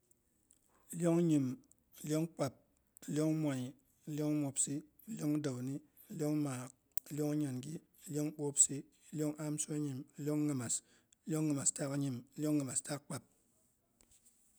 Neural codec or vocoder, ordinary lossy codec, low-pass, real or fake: none; none; none; real